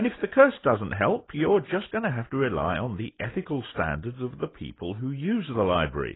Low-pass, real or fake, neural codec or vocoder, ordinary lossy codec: 7.2 kHz; real; none; AAC, 16 kbps